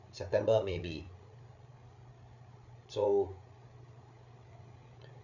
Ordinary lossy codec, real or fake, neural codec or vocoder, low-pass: none; fake; codec, 16 kHz, 8 kbps, FreqCodec, larger model; 7.2 kHz